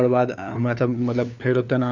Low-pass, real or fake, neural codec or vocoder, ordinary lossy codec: 7.2 kHz; fake; codec, 16 kHz, 4 kbps, FunCodec, trained on LibriTTS, 50 frames a second; none